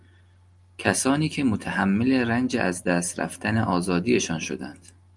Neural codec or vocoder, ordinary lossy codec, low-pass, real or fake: none; Opus, 32 kbps; 10.8 kHz; real